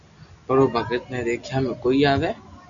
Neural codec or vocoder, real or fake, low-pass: none; real; 7.2 kHz